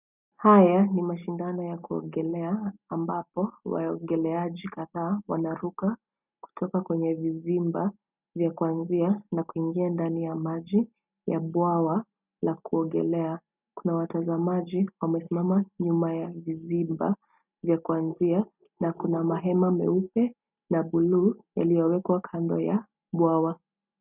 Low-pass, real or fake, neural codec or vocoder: 3.6 kHz; real; none